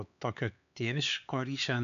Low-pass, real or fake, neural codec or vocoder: 7.2 kHz; fake; codec, 16 kHz, 4 kbps, X-Codec, HuBERT features, trained on LibriSpeech